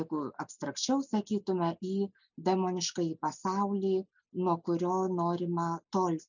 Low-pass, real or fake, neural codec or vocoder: 7.2 kHz; real; none